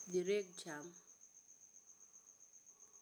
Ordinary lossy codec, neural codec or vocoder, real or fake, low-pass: none; none; real; none